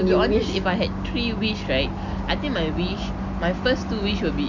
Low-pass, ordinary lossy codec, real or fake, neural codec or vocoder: 7.2 kHz; none; real; none